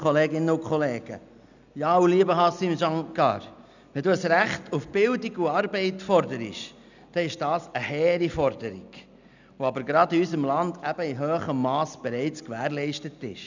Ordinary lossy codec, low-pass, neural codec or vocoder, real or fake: none; 7.2 kHz; none; real